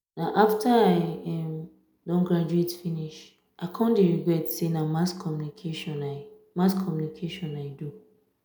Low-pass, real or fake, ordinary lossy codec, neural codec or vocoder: none; real; none; none